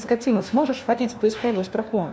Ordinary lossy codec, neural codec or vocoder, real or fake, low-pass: none; codec, 16 kHz, 1 kbps, FunCodec, trained on LibriTTS, 50 frames a second; fake; none